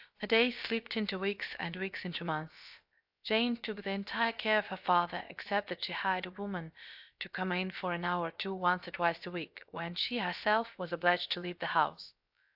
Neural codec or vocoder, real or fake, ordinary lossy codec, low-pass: codec, 16 kHz, about 1 kbps, DyCAST, with the encoder's durations; fake; AAC, 48 kbps; 5.4 kHz